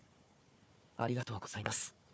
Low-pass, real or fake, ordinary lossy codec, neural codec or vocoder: none; fake; none; codec, 16 kHz, 4 kbps, FunCodec, trained on Chinese and English, 50 frames a second